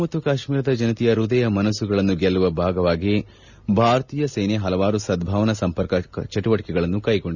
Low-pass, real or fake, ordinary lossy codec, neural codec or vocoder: 7.2 kHz; real; none; none